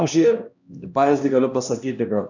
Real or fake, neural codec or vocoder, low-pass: fake; codec, 16 kHz, 1 kbps, X-Codec, HuBERT features, trained on LibriSpeech; 7.2 kHz